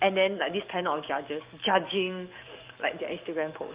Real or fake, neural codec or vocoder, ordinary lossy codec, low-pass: fake; codec, 44.1 kHz, 7.8 kbps, DAC; Opus, 24 kbps; 3.6 kHz